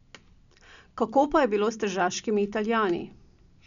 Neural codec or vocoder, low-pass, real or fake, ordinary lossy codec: none; 7.2 kHz; real; none